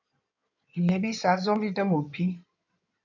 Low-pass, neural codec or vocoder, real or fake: 7.2 kHz; codec, 16 kHz in and 24 kHz out, 2.2 kbps, FireRedTTS-2 codec; fake